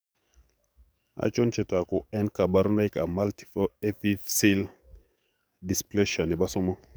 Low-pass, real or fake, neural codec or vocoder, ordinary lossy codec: none; fake; codec, 44.1 kHz, 7.8 kbps, DAC; none